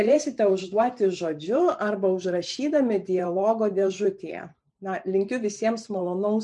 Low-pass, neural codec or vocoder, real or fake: 10.8 kHz; vocoder, 44.1 kHz, 128 mel bands every 512 samples, BigVGAN v2; fake